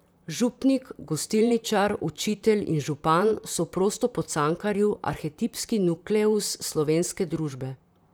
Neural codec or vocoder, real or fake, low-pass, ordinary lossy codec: vocoder, 44.1 kHz, 128 mel bands, Pupu-Vocoder; fake; none; none